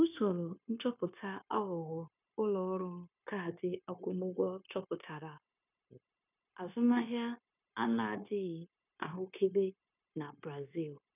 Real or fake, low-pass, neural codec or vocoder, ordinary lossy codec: fake; 3.6 kHz; codec, 16 kHz, 0.9 kbps, LongCat-Audio-Codec; none